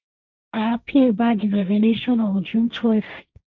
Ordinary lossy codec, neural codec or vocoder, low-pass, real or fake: none; codec, 16 kHz, 1.1 kbps, Voila-Tokenizer; 7.2 kHz; fake